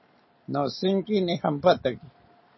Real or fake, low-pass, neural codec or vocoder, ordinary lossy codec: real; 7.2 kHz; none; MP3, 24 kbps